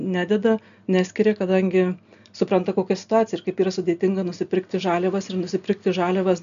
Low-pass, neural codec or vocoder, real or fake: 7.2 kHz; none; real